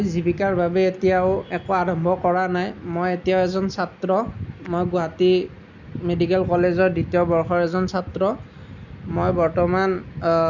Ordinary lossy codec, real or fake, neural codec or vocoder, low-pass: none; real; none; 7.2 kHz